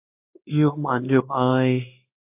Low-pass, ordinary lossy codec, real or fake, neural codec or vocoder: 3.6 kHz; AAC, 32 kbps; fake; codec, 16 kHz in and 24 kHz out, 1 kbps, XY-Tokenizer